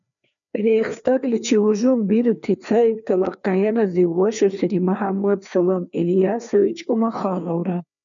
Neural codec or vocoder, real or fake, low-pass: codec, 16 kHz, 2 kbps, FreqCodec, larger model; fake; 7.2 kHz